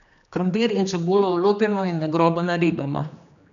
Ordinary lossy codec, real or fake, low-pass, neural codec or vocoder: none; fake; 7.2 kHz; codec, 16 kHz, 2 kbps, X-Codec, HuBERT features, trained on general audio